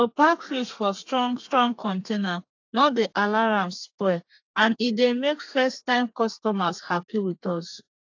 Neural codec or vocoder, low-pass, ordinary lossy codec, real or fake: codec, 32 kHz, 1.9 kbps, SNAC; 7.2 kHz; AAC, 48 kbps; fake